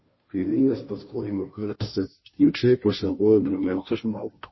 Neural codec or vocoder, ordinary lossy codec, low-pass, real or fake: codec, 16 kHz, 0.5 kbps, FunCodec, trained on Chinese and English, 25 frames a second; MP3, 24 kbps; 7.2 kHz; fake